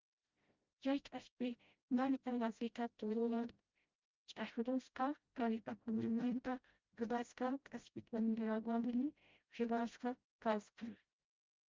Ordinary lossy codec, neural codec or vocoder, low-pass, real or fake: Opus, 24 kbps; codec, 16 kHz, 0.5 kbps, FreqCodec, smaller model; 7.2 kHz; fake